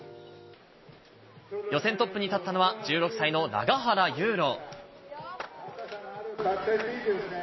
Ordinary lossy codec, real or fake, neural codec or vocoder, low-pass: MP3, 24 kbps; real; none; 7.2 kHz